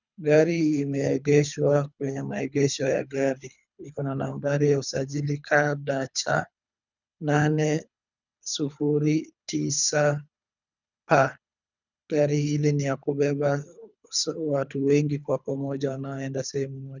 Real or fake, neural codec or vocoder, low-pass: fake; codec, 24 kHz, 3 kbps, HILCodec; 7.2 kHz